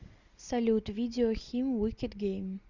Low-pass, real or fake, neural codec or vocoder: 7.2 kHz; real; none